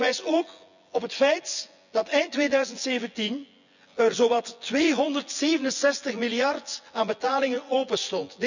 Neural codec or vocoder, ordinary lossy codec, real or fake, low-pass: vocoder, 24 kHz, 100 mel bands, Vocos; none; fake; 7.2 kHz